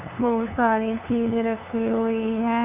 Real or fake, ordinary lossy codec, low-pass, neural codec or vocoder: fake; none; 3.6 kHz; codec, 16 kHz, 2 kbps, FunCodec, trained on LibriTTS, 25 frames a second